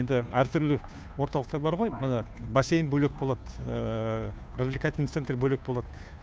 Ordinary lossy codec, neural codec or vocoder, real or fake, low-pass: none; codec, 16 kHz, 2 kbps, FunCodec, trained on Chinese and English, 25 frames a second; fake; none